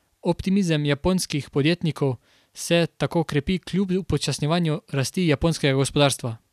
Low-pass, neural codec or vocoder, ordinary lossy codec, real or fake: 14.4 kHz; none; none; real